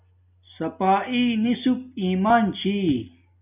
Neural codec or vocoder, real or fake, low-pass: none; real; 3.6 kHz